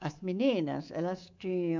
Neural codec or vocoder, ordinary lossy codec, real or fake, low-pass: codec, 24 kHz, 3.1 kbps, DualCodec; MP3, 64 kbps; fake; 7.2 kHz